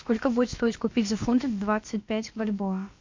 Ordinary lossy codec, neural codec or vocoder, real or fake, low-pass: MP3, 48 kbps; codec, 16 kHz, about 1 kbps, DyCAST, with the encoder's durations; fake; 7.2 kHz